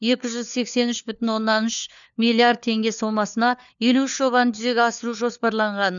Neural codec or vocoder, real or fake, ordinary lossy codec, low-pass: codec, 16 kHz, 4 kbps, FunCodec, trained on LibriTTS, 50 frames a second; fake; none; 7.2 kHz